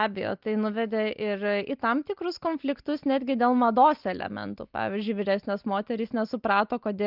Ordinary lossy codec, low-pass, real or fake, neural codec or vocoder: Opus, 24 kbps; 5.4 kHz; real; none